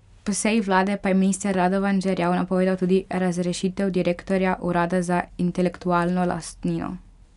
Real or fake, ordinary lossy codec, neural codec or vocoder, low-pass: real; none; none; 10.8 kHz